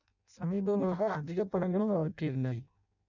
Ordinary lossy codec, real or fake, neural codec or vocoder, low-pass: none; fake; codec, 16 kHz in and 24 kHz out, 0.6 kbps, FireRedTTS-2 codec; 7.2 kHz